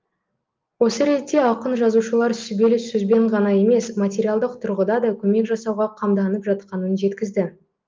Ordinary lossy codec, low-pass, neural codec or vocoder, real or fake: Opus, 24 kbps; 7.2 kHz; none; real